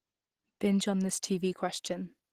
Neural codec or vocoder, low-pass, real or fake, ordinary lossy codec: none; 14.4 kHz; real; Opus, 16 kbps